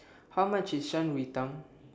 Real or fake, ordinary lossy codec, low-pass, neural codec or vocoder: real; none; none; none